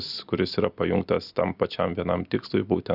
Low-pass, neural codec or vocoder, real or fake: 5.4 kHz; none; real